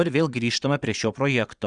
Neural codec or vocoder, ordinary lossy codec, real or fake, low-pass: vocoder, 22.05 kHz, 80 mel bands, Vocos; Opus, 64 kbps; fake; 9.9 kHz